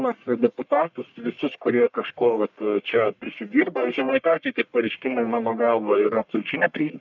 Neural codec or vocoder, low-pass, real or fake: codec, 44.1 kHz, 1.7 kbps, Pupu-Codec; 7.2 kHz; fake